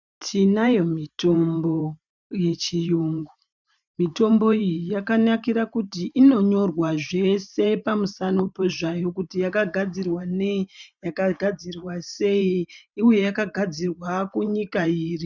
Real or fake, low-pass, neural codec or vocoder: fake; 7.2 kHz; vocoder, 44.1 kHz, 128 mel bands every 512 samples, BigVGAN v2